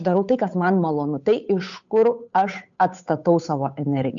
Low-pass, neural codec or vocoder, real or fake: 7.2 kHz; codec, 16 kHz, 8 kbps, FunCodec, trained on Chinese and English, 25 frames a second; fake